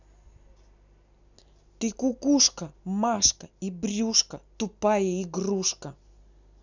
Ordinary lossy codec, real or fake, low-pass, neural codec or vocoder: none; real; 7.2 kHz; none